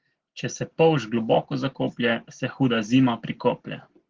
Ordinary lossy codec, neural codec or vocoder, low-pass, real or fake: Opus, 16 kbps; none; 7.2 kHz; real